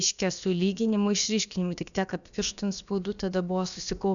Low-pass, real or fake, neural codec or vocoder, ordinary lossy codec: 7.2 kHz; fake; codec, 16 kHz, about 1 kbps, DyCAST, with the encoder's durations; MP3, 96 kbps